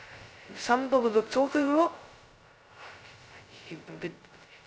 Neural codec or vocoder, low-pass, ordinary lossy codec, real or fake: codec, 16 kHz, 0.2 kbps, FocalCodec; none; none; fake